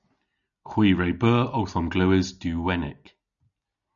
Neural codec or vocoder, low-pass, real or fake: none; 7.2 kHz; real